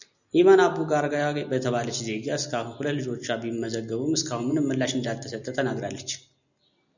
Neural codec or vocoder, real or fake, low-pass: none; real; 7.2 kHz